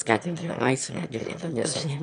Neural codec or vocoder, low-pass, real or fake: autoencoder, 22.05 kHz, a latent of 192 numbers a frame, VITS, trained on one speaker; 9.9 kHz; fake